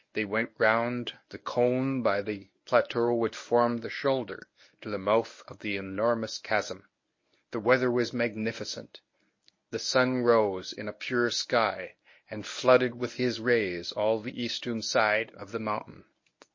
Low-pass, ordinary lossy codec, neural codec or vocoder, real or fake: 7.2 kHz; MP3, 32 kbps; codec, 24 kHz, 0.9 kbps, WavTokenizer, medium speech release version 1; fake